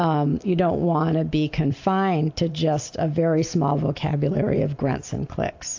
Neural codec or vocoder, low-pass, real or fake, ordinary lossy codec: none; 7.2 kHz; real; AAC, 48 kbps